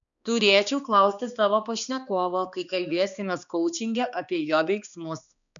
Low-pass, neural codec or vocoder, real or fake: 7.2 kHz; codec, 16 kHz, 2 kbps, X-Codec, HuBERT features, trained on balanced general audio; fake